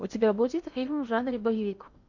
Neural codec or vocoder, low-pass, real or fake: codec, 16 kHz in and 24 kHz out, 0.8 kbps, FocalCodec, streaming, 65536 codes; 7.2 kHz; fake